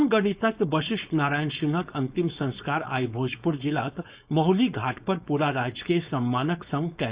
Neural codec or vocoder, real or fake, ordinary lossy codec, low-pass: codec, 16 kHz, 4.8 kbps, FACodec; fake; Opus, 64 kbps; 3.6 kHz